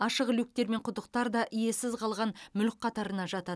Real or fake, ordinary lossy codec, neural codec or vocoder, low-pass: real; none; none; none